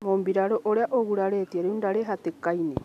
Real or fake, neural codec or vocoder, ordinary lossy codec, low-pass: real; none; none; 14.4 kHz